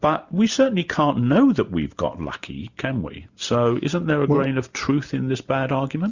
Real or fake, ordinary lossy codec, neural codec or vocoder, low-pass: real; Opus, 64 kbps; none; 7.2 kHz